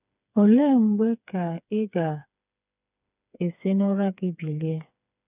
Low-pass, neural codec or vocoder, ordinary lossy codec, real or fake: 3.6 kHz; codec, 16 kHz, 4 kbps, FreqCodec, smaller model; none; fake